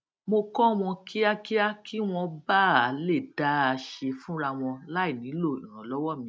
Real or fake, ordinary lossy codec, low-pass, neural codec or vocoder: real; none; none; none